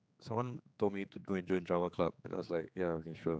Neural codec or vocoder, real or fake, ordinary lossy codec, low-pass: codec, 16 kHz, 4 kbps, X-Codec, HuBERT features, trained on general audio; fake; none; none